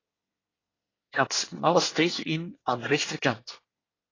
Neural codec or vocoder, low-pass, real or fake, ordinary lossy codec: codec, 24 kHz, 1 kbps, SNAC; 7.2 kHz; fake; AAC, 32 kbps